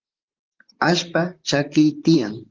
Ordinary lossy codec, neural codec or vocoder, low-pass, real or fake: Opus, 24 kbps; vocoder, 44.1 kHz, 128 mel bands, Pupu-Vocoder; 7.2 kHz; fake